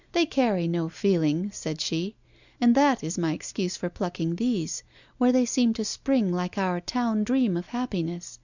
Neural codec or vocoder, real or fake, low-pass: none; real; 7.2 kHz